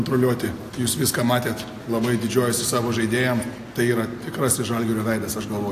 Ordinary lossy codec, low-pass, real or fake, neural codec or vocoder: AAC, 64 kbps; 14.4 kHz; real; none